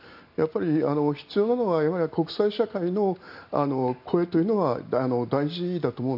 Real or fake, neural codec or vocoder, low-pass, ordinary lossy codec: real; none; 5.4 kHz; AAC, 48 kbps